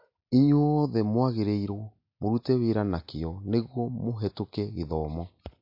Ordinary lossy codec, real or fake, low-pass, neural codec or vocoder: MP3, 32 kbps; real; 5.4 kHz; none